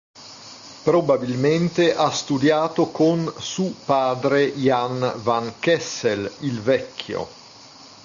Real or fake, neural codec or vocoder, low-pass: real; none; 7.2 kHz